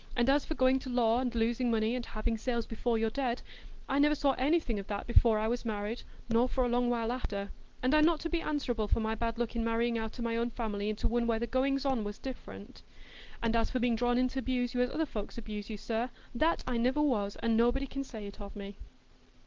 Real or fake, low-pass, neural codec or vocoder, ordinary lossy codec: real; 7.2 kHz; none; Opus, 16 kbps